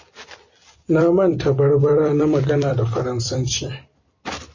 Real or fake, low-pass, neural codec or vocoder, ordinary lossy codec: fake; 7.2 kHz; vocoder, 44.1 kHz, 128 mel bands every 256 samples, BigVGAN v2; MP3, 32 kbps